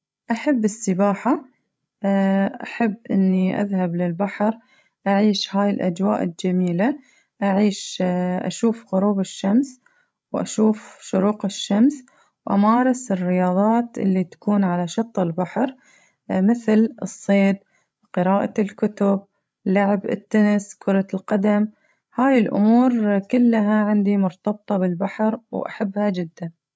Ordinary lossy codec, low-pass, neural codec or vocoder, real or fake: none; none; codec, 16 kHz, 16 kbps, FreqCodec, larger model; fake